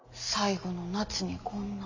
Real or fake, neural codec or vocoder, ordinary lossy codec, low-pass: real; none; AAC, 48 kbps; 7.2 kHz